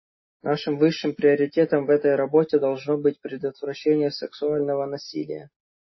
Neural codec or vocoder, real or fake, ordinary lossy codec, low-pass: none; real; MP3, 24 kbps; 7.2 kHz